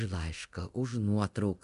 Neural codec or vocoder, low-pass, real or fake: codec, 24 kHz, 0.9 kbps, DualCodec; 10.8 kHz; fake